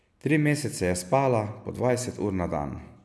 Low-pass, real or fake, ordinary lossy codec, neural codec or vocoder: none; real; none; none